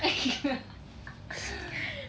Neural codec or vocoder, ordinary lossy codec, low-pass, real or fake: none; none; none; real